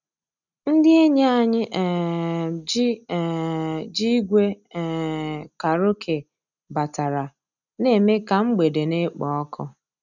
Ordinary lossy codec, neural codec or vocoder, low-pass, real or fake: none; none; 7.2 kHz; real